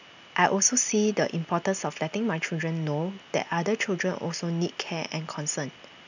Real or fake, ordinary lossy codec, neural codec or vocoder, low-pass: real; none; none; 7.2 kHz